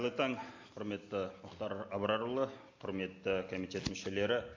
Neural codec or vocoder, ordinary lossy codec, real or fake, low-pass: none; AAC, 48 kbps; real; 7.2 kHz